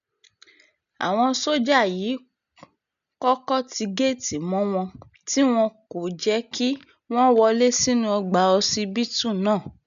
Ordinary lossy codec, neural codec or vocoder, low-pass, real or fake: none; none; 7.2 kHz; real